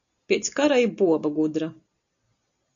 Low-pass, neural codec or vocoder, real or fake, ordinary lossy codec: 7.2 kHz; none; real; AAC, 64 kbps